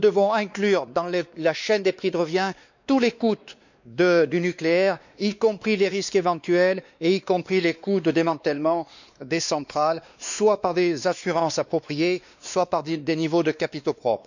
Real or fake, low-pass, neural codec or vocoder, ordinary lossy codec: fake; 7.2 kHz; codec, 16 kHz, 2 kbps, X-Codec, WavLM features, trained on Multilingual LibriSpeech; none